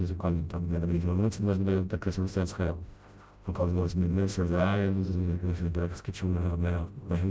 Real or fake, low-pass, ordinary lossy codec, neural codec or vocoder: fake; none; none; codec, 16 kHz, 0.5 kbps, FreqCodec, smaller model